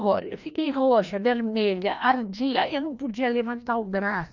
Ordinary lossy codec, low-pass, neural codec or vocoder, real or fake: none; 7.2 kHz; codec, 16 kHz, 1 kbps, FreqCodec, larger model; fake